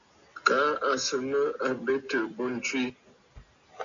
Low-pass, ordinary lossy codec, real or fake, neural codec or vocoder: 7.2 kHz; MP3, 64 kbps; real; none